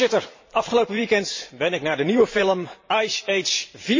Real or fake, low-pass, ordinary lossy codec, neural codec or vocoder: fake; 7.2 kHz; MP3, 32 kbps; vocoder, 44.1 kHz, 128 mel bands every 512 samples, BigVGAN v2